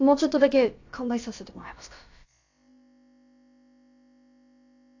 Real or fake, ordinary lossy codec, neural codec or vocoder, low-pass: fake; AAC, 48 kbps; codec, 16 kHz, about 1 kbps, DyCAST, with the encoder's durations; 7.2 kHz